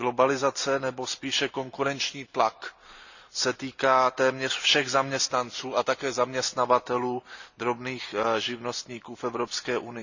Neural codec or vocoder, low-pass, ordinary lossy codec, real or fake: none; 7.2 kHz; none; real